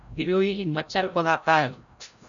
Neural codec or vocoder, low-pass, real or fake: codec, 16 kHz, 0.5 kbps, FreqCodec, larger model; 7.2 kHz; fake